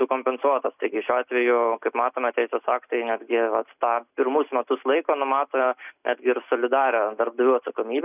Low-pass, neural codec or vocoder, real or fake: 3.6 kHz; none; real